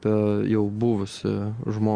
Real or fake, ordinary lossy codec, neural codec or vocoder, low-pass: real; Opus, 32 kbps; none; 9.9 kHz